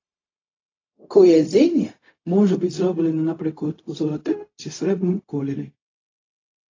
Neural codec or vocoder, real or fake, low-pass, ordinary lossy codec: codec, 16 kHz, 0.4 kbps, LongCat-Audio-Codec; fake; 7.2 kHz; AAC, 32 kbps